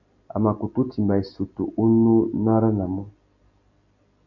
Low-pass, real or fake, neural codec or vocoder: 7.2 kHz; real; none